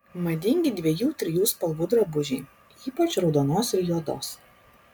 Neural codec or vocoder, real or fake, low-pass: none; real; 19.8 kHz